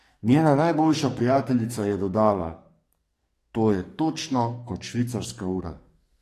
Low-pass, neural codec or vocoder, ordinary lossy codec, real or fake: 14.4 kHz; codec, 44.1 kHz, 2.6 kbps, SNAC; AAC, 48 kbps; fake